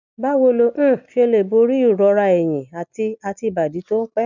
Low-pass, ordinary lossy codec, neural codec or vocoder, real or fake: 7.2 kHz; none; none; real